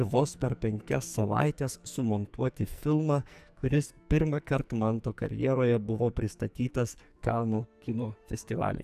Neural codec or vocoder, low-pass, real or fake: codec, 44.1 kHz, 2.6 kbps, SNAC; 14.4 kHz; fake